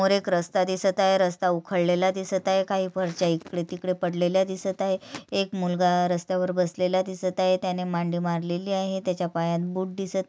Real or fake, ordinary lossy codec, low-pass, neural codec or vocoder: real; none; none; none